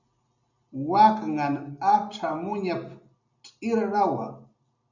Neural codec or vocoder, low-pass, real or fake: none; 7.2 kHz; real